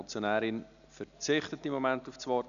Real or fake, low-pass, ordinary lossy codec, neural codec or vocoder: real; 7.2 kHz; none; none